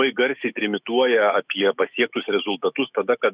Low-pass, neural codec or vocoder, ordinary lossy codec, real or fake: 3.6 kHz; autoencoder, 48 kHz, 128 numbers a frame, DAC-VAE, trained on Japanese speech; Opus, 64 kbps; fake